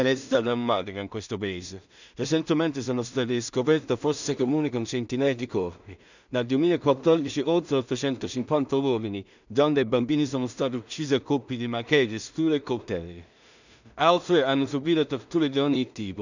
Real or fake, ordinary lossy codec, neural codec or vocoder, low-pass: fake; none; codec, 16 kHz in and 24 kHz out, 0.4 kbps, LongCat-Audio-Codec, two codebook decoder; 7.2 kHz